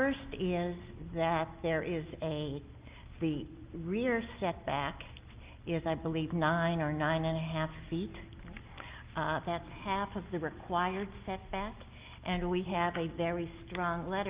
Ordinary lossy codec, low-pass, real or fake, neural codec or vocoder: Opus, 32 kbps; 3.6 kHz; real; none